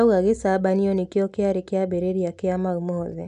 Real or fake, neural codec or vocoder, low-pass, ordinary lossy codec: real; none; 10.8 kHz; none